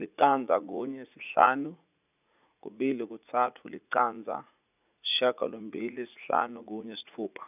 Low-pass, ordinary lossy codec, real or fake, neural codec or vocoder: 3.6 kHz; none; fake; vocoder, 44.1 kHz, 80 mel bands, Vocos